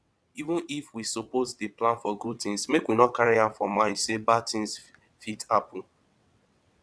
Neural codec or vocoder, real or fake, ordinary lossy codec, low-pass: vocoder, 22.05 kHz, 80 mel bands, WaveNeXt; fake; none; none